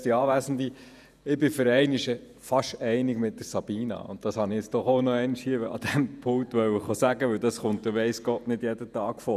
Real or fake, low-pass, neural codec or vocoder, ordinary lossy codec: fake; 14.4 kHz; vocoder, 48 kHz, 128 mel bands, Vocos; none